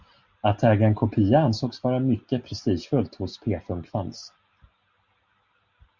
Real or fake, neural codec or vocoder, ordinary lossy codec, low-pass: real; none; Opus, 64 kbps; 7.2 kHz